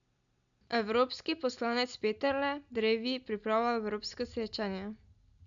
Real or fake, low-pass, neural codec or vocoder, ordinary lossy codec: real; 7.2 kHz; none; none